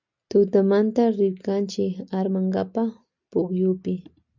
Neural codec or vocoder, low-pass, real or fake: none; 7.2 kHz; real